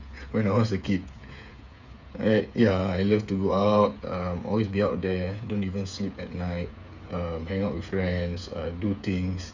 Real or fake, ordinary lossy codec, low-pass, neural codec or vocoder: fake; none; 7.2 kHz; codec, 16 kHz, 8 kbps, FreqCodec, smaller model